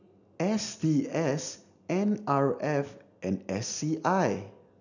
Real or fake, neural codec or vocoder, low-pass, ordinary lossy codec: real; none; 7.2 kHz; none